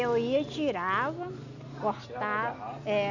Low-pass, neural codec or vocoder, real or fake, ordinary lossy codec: 7.2 kHz; none; real; none